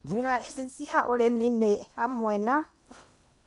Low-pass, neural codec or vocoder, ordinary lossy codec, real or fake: 10.8 kHz; codec, 16 kHz in and 24 kHz out, 0.8 kbps, FocalCodec, streaming, 65536 codes; none; fake